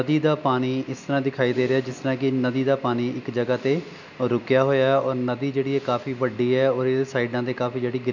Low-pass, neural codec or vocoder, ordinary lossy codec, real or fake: 7.2 kHz; none; none; real